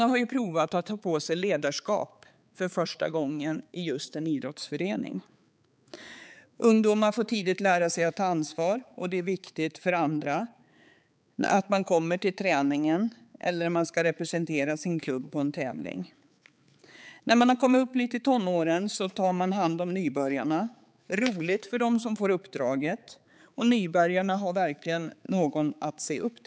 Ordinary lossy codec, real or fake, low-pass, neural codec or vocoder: none; fake; none; codec, 16 kHz, 4 kbps, X-Codec, HuBERT features, trained on balanced general audio